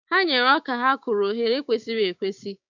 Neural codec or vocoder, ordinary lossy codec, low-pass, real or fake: codec, 16 kHz, 6 kbps, DAC; MP3, 64 kbps; 7.2 kHz; fake